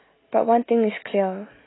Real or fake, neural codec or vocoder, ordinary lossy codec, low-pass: real; none; AAC, 16 kbps; 7.2 kHz